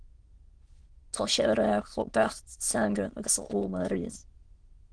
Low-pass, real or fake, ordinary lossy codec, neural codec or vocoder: 9.9 kHz; fake; Opus, 16 kbps; autoencoder, 22.05 kHz, a latent of 192 numbers a frame, VITS, trained on many speakers